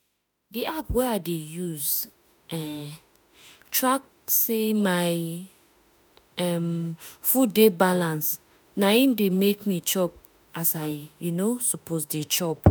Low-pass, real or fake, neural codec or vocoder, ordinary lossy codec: none; fake; autoencoder, 48 kHz, 32 numbers a frame, DAC-VAE, trained on Japanese speech; none